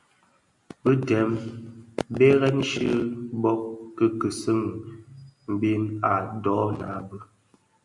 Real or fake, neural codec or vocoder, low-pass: real; none; 10.8 kHz